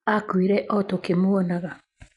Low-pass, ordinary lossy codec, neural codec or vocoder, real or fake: 10.8 kHz; none; none; real